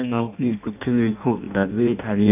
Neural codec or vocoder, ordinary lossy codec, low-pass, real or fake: codec, 16 kHz in and 24 kHz out, 0.6 kbps, FireRedTTS-2 codec; none; 3.6 kHz; fake